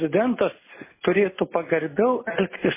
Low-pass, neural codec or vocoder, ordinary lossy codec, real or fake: 3.6 kHz; none; MP3, 16 kbps; real